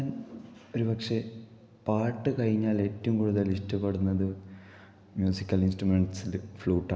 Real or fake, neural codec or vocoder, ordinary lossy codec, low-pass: real; none; none; none